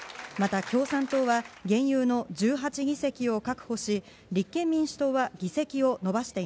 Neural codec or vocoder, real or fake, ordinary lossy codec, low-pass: none; real; none; none